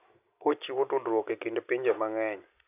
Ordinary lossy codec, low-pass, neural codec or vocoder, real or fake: none; 3.6 kHz; none; real